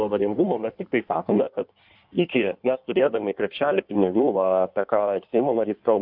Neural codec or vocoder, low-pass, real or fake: codec, 16 kHz in and 24 kHz out, 1.1 kbps, FireRedTTS-2 codec; 5.4 kHz; fake